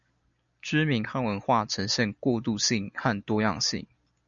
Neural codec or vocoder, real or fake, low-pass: none; real; 7.2 kHz